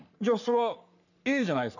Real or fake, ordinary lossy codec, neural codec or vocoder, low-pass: fake; none; codec, 44.1 kHz, 3.4 kbps, Pupu-Codec; 7.2 kHz